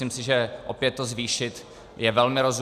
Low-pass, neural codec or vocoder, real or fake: 14.4 kHz; none; real